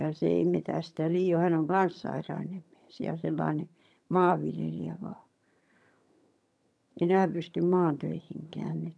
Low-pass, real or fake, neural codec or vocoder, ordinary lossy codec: none; fake; vocoder, 22.05 kHz, 80 mel bands, HiFi-GAN; none